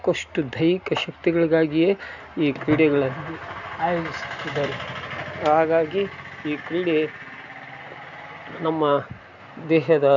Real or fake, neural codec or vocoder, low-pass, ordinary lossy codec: real; none; 7.2 kHz; none